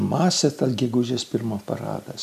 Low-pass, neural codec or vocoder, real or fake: 14.4 kHz; none; real